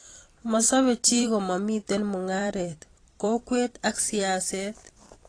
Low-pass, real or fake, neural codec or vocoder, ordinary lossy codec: 9.9 kHz; fake; vocoder, 44.1 kHz, 128 mel bands every 512 samples, BigVGAN v2; AAC, 32 kbps